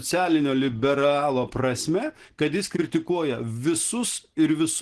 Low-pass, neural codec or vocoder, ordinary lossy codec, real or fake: 10.8 kHz; none; Opus, 16 kbps; real